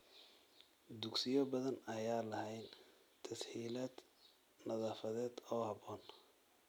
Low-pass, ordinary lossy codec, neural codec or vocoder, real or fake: none; none; none; real